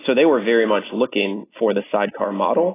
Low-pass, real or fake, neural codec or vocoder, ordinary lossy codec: 3.6 kHz; real; none; AAC, 16 kbps